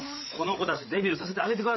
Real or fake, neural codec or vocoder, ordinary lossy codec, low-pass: fake; codec, 16 kHz, 8 kbps, FreqCodec, larger model; MP3, 24 kbps; 7.2 kHz